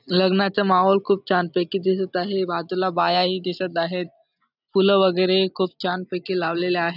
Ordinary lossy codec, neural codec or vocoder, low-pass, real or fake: none; none; 5.4 kHz; real